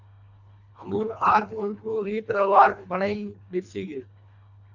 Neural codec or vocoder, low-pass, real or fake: codec, 24 kHz, 1.5 kbps, HILCodec; 7.2 kHz; fake